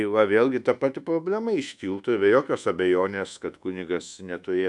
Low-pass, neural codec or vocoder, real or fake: 10.8 kHz; codec, 24 kHz, 1.2 kbps, DualCodec; fake